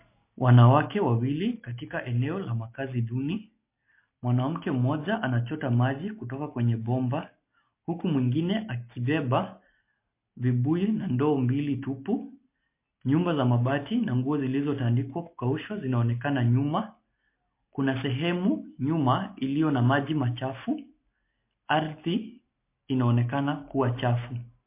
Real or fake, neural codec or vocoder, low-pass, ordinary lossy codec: real; none; 3.6 kHz; MP3, 24 kbps